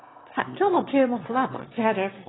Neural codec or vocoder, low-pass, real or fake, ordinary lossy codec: autoencoder, 22.05 kHz, a latent of 192 numbers a frame, VITS, trained on one speaker; 7.2 kHz; fake; AAC, 16 kbps